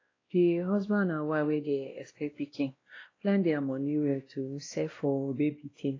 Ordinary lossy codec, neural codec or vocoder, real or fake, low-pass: AAC, 32 kbps; codec, 16 kHz, 1 kbps, X-Codec, WavLM features, trained on Multilingual LibriSpeech; fake; 7.2 kHz